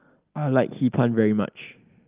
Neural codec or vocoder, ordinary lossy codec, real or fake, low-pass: none; Opus, 32 kbps; real; 3.6 kHz